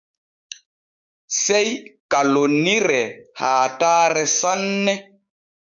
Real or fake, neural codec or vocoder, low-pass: fake; codec, 16 kHz, 6 kbps, DAC; 7.2 kHz